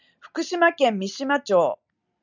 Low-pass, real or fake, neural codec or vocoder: 7.2 kHz; real; none